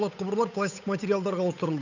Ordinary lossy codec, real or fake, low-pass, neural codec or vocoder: none; fake; 7.2 kHz; codec, 16 kHz, 8 kbps, FunCodec, trained on Chinese and English, 25 frames a second